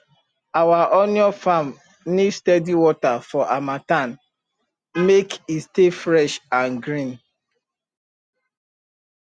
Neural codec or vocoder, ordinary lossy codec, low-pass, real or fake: none; Opus, 64 kbps; 9.9 kHz; real